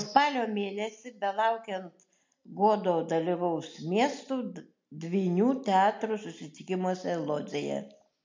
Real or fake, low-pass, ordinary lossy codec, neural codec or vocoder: real; 7.2 kHz; MP3, 48 kbps; none